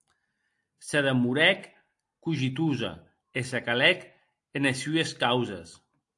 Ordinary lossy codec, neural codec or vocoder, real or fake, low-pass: AAC, 64 kbps; none; real; 10.8 kHz